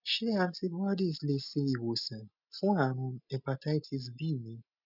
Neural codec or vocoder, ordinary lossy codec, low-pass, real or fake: none; none; 5.4 kHz; real